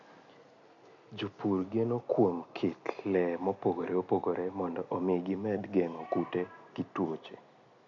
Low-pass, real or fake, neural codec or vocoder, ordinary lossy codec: 7.2 kHz; real; none; none